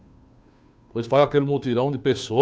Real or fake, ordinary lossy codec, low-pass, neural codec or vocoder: fake; none; none; codec, 16 kHz, 2 kbps, FunCodec, trained on Chinese and English, 25 frames a second